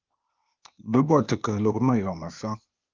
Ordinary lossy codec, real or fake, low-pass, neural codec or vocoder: Opus, 32 kbps; fake; 7.2 kHz; codec, 16 kHz, 0.8 kbps, ZipCodec